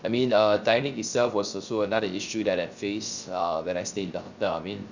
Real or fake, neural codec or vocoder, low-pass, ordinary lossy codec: fake; codec, 16 kHz, 0.3 kbps, FocalCodec; 7.2 kHz; Opus, 64 kbps